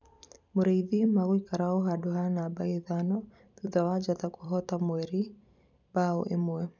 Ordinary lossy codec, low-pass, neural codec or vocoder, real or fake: none; 7.2 kHz; vocoder, 44.1 kHz, 128 mel bands every 256 samples, BigVGAN v2; fake